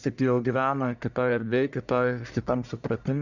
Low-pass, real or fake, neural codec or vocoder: 7.2 kHz; fake; codec, 44.1 kHz, 1.7 kbps, Pupu-Codec